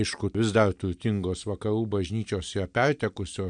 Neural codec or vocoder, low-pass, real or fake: none; 9.9 kHz; real